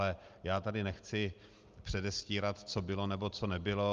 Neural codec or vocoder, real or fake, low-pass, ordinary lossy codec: none; real; 7.2 kHz; Opus, 32 kbps